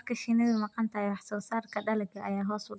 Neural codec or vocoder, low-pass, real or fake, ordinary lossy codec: none; none; real; none